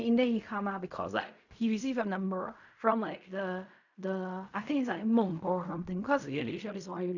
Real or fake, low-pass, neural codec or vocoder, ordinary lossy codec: fake; 7.2 kHz; codec, 16 kHz in and 24 kHz out, 0.4 kbps, LongCat-Audio-Codec, fine tuned four codebook decoder; none